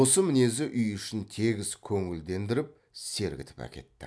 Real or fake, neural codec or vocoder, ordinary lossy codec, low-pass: real; none; none; none